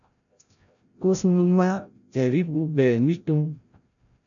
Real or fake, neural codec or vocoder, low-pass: fake; codec, 16 kHz, 0.5 kbps, FreqCodec, larger model; 7.2 kHz